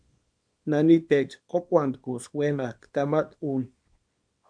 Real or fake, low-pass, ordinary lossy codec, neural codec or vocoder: fake; 9.9 kHz; MP3, 64 kbps; codec, 24 kHz, 0.9 kbps, WavTokenizer, small release